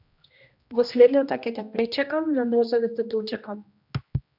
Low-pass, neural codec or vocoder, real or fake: 5.4 kHz; codec, 16 kHz, 1 kbps, X-Codec, HuBERT features, trained on general audio; fake